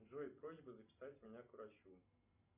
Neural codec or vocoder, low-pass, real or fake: none; 3.6 kHz; real